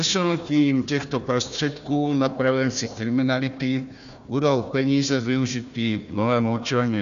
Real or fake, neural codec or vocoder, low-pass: fake; codec, 16 kHz, 1 kbps, FunCodec, trained on Chinese and English, 50 frames a second; 7.2 kHz